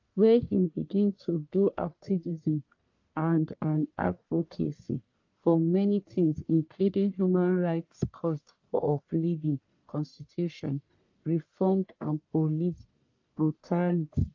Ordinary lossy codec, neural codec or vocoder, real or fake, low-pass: AAC, 48 kbps; codec, 44.1 kHz, 1.7 kbps, Pupu-Codec; fake; 7.2 kHz